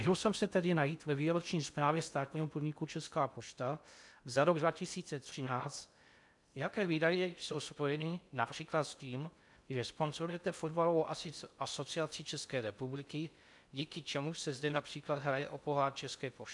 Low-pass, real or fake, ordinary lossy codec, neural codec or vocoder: 10.8 kHz; fake; MP3, 96 kbps; codec, 16 kHz in and 24 kHz out, 0.6 kbps, FocalCodec, streaming, 2048 codes